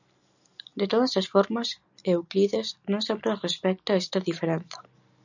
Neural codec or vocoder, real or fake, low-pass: none; real; 7.2 kHz